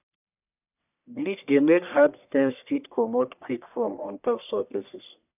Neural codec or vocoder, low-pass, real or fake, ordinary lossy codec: codec, 44.1 kHz, 1.7 kbps, Pupu-Codec; 3.6 kHz; fake; none